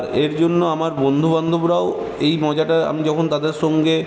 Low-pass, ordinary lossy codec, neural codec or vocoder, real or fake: none; none; none; real